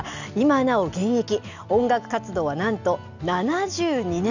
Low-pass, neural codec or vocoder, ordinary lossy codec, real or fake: 7.2 kHz; none; none; real